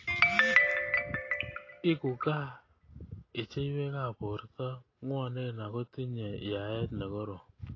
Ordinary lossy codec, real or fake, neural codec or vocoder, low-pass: AAC, 32 kbps; real; none; 7.2 kHz